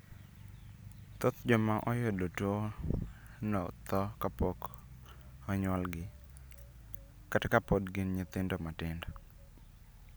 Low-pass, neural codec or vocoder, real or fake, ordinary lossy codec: none; none; real; none